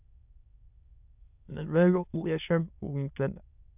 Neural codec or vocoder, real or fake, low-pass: autoencoder, 22.05 kHz, a latent of 192 numbers a frame, VITS, trained on many speakers; fake; 3.6 kHz